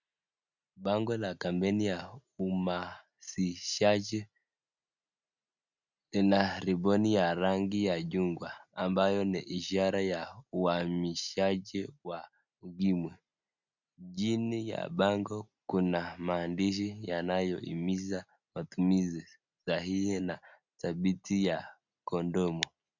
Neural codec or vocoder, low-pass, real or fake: none; 7.2 kHz; real